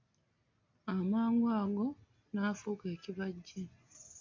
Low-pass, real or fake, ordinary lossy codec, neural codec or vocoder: 7.2 kHz; real; MP3, 48 kbps; none